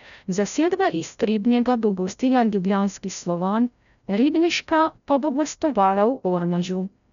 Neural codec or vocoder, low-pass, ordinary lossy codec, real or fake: codec, 16 kHz, 0.5 kbps, FreqCodec, larger model; 7.2 kHz; none; fake